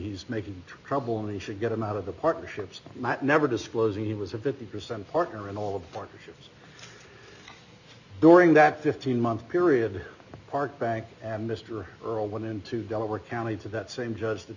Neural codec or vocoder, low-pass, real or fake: none; 7.2 kHz; real